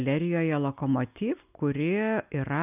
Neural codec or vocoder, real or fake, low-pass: none; real; 3.6 kHz